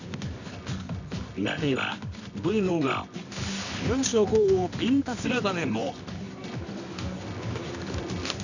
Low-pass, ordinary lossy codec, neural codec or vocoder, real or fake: 7.2 kHz; none; codec, 24 kHz, 0.9 kbps, WavTokenizer, medium music audio release; fake